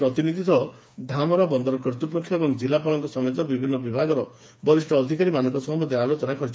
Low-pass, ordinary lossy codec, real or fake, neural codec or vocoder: none; none; fake; codec, 16 kHz, 4 kbps, FreqCodec, smaller model